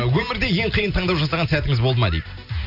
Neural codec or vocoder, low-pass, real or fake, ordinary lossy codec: none; 5.4 kHz; real; none